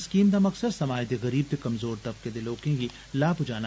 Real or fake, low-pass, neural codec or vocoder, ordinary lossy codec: real; none; none; none